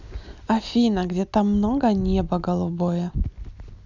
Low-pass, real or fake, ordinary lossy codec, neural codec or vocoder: 7.2 kHz; real; none; none